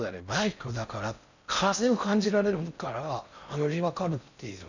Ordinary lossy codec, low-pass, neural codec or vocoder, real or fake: none; 7.2 kHz; codec, 16 kHz in and 24 kHz out, 0.6 kbps, FocalCodec, streaming, 4096 codes; fake